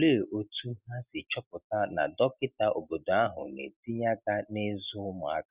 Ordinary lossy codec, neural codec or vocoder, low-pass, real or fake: Opus, 64 kbps; none; 3.6 kHz; real